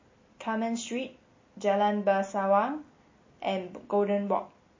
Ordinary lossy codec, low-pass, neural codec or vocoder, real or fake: MP3, 32 kbps; 7.2 kHz; none; real